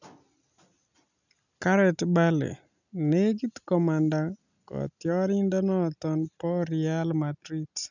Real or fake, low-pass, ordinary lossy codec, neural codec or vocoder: real; 7.2 kHz; none; none